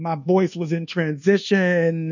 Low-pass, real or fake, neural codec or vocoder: 7.2 kHz; fake; codec, 24 kHz, 1.2 kbps, DualCodec